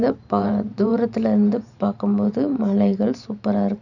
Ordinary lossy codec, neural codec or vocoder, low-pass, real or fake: none; vocoder, 44.1 kHz, 128 mel bands every 512 samples, BigVGAN v2; 7.2 kHz; fake